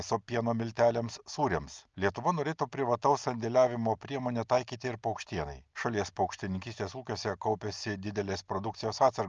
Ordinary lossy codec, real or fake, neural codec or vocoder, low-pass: Opus, 24 kbps; real; none; 7.2 kHz